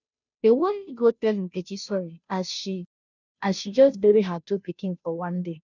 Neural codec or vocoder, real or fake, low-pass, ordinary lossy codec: codec, 16 kHz, 0.5 kbps, FunCodec, trained on Chinese and English, 25 frames a second; fake; 7.2 kHz; none